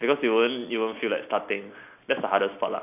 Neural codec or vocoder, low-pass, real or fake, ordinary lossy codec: none; 3.6 kHz; real; none